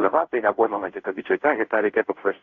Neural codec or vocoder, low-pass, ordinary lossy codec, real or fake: codec, 16 kHz, 0.5 kbps, FunCodec, trained on Chinese and English, 25 frames a second; 7.2 kHz; AAC, 32 kbps; fake